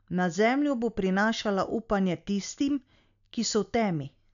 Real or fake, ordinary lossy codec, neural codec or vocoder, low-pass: real; none; none; 7.2 kHz